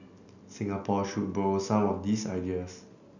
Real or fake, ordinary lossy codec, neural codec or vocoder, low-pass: real; none; none; 7.2 kHz